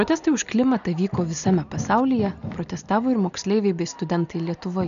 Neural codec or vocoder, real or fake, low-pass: none; real; 7.2 kHz